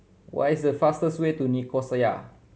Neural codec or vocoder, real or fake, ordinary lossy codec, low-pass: none; real; none; none